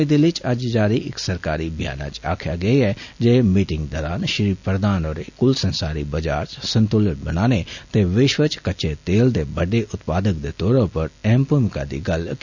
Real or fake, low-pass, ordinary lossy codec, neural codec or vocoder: real; 7.2 kHz; none; none